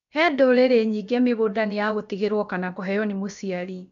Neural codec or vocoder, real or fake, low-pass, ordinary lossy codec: codec, 16 kHz, about 1 kbps, DyCAST, with the encoder's durations; fake; 7.2 kHz; none